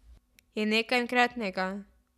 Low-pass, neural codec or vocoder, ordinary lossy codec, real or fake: 14.4 kHz; none; none; real